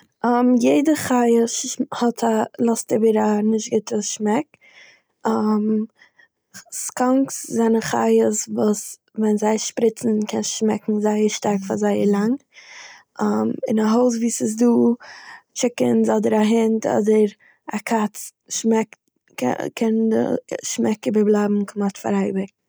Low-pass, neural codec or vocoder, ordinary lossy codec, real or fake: none; none; none; real